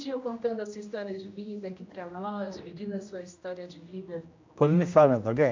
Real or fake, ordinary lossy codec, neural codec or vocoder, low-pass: fake; MP3, 48 kbps; codec, 16 kHz, 1 kbps, X-Codec, HuBERT features, trained on general audio; 7.2 kHz